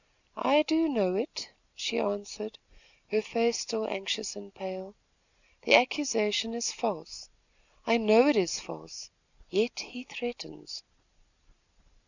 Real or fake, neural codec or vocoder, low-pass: real; none; 7.2 kHz